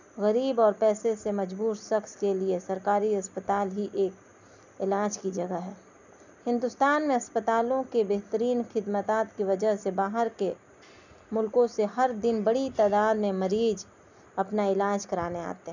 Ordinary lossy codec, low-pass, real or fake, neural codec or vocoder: none; 7.2 kHz; real; none